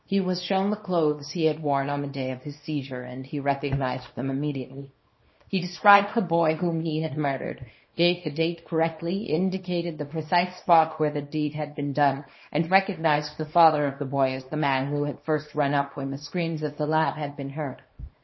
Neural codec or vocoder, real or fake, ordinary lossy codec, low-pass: codec, 24 kHz, 0.9 kbps, WavTokenizer, small release; fake; MP3, 24 kbps; 7.2 kHz